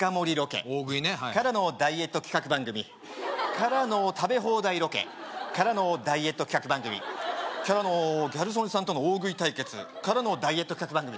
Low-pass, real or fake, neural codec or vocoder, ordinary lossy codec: none; real; none; none